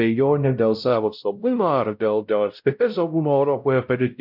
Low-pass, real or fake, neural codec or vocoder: 5.4 kHz; fake; codec, 16 kHz, 0.5 kbps, X-Codec, WavLM features, trained on Multilingual LibriSpeech